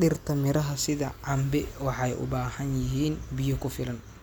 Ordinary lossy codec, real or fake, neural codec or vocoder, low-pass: none; real; none; none